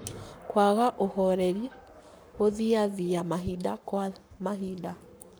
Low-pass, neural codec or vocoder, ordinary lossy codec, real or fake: none; codec, 44.1 kHz, 7.8 kbps, Pupu-Codec; none; fake